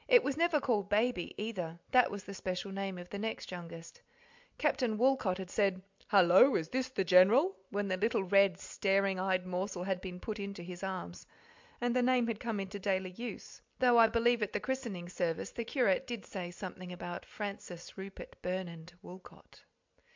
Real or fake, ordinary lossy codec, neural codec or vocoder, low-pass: real; MP3, 64 kbps; none; 7.2 kHz